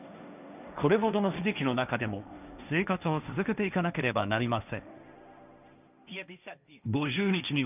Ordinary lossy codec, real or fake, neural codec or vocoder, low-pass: none; fake; codec, 16 kHz, 1.1 kbps, Voila-Tokenizer; 3.6 kHz